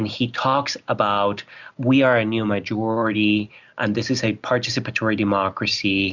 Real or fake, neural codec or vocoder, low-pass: real; none; 7.2 kHz